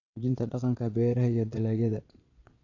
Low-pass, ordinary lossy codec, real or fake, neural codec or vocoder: 7.2 kHz; none; fake; vocoder, 22.05 kHz, 80 mel bands, Vocos